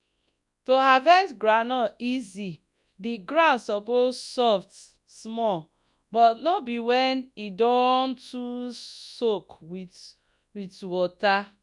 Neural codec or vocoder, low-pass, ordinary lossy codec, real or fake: codec, 24 kHz, 0.9 kbps, WavTokenizer, large speech release; 10.8 kHz; none; fake